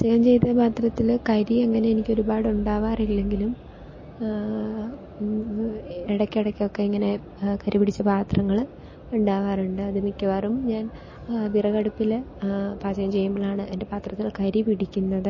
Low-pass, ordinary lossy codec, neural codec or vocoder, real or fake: 7.2 kHz; MP3, 32 kbps; none; real